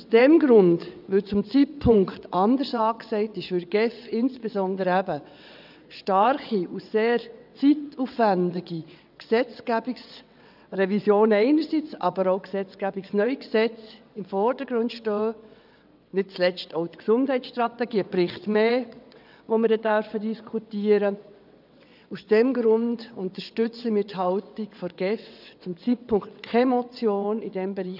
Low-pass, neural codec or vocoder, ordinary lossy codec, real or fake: 5.4 kHz; vocoder, 22.05 kHz, 80 mel bands, WaveNeXt; none; fake